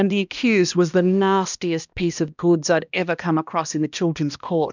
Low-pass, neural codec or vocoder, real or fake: 7.2 kHz; codec, 16 kHz, 1 kbps, X-Codec, HuBERT features, trained on balanced general audio; fake